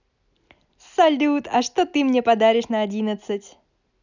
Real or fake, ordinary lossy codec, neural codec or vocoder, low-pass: real; none; none; 7.2 kHz